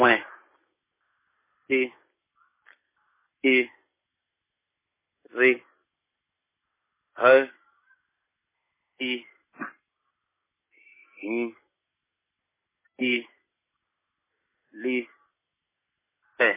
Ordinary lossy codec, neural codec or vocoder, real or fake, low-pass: MP3, 16 kbps; none; real; 3.6 kHz